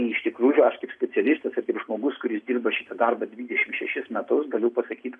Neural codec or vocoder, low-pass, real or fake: none; 10.8 kHz; real